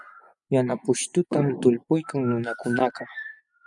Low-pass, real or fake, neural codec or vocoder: 10.8 kHz; fake; vocoder, 24 kHz, 100 mel bands, Vocos